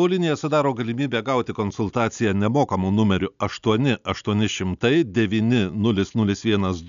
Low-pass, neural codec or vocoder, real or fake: 7.2 kHz; none; real